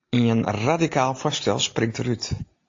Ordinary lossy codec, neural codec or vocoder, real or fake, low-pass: AAC, 48 kbps; none; real; 7.2 kHz